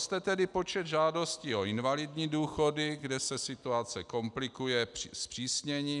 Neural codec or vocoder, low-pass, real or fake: none; 10.8 kHz; real